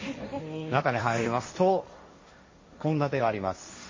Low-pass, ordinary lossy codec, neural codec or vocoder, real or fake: 7.2 kHz; MP3, 32 kbps; codec, 16 kHz, 1.1 kbps, Voila-Tokenizer; fake